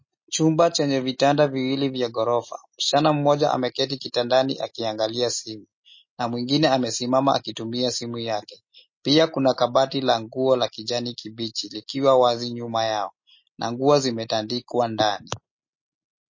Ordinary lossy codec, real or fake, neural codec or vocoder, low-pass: MP3, 32 kbps; real; none; 7.2 kHz